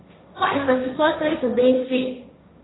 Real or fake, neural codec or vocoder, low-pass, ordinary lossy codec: fake; codec, 16 kHz, 1.1 kbps, Voila-Tokenizer; 7.2 kHz; AAC, 16 kbps